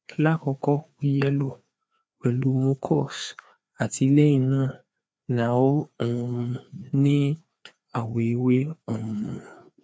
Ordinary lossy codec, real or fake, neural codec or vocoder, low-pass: none; fake; codec, 16 kHz, 2 kbps, FreqCodec, larger model; none